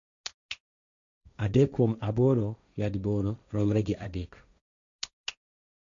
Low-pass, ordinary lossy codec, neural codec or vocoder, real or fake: 7.2 kHz; none; codec, 16 kHz, 1.1 kbps, Voila-Tokenizer; fake